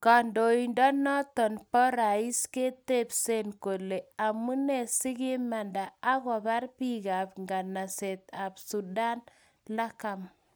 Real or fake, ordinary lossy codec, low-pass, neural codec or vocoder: real; none; none; none